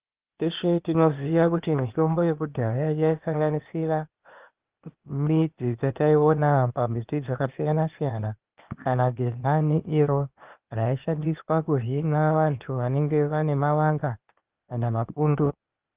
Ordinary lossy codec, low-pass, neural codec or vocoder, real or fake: Opus, 32 kbps; 3.6 kHz; codec, 16 kHz, 0.8 kbps, ZipCodec; fake